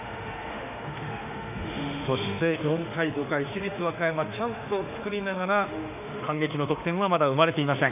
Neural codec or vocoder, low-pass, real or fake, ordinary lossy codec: autoencoder, 48 kHz, 32 numbers a frame, DAC-VAE, trained on Japanese speech; 3.6 kHz; fake; none